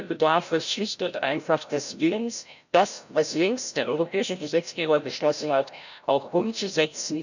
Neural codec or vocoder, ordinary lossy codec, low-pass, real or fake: codec, 16 kHz, 0.5 kbps, FreqCodec, larger model; none; 7.2 kHz; fake